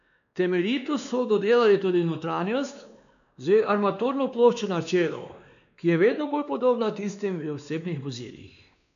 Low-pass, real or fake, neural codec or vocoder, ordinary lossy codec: 7.2 kHz; fake; codec, 16 kHz, 2 kbps, X-Codec, WavLM features, trained on Multilingual LibriSpeech; none